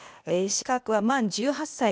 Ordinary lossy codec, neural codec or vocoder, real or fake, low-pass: none; codec, 16 kHz, 0.8 kbps, ZipCodec; fake; none